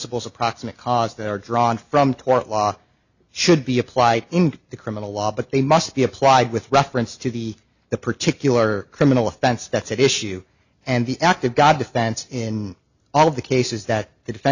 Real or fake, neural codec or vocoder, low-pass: real; none; 7.2 kHz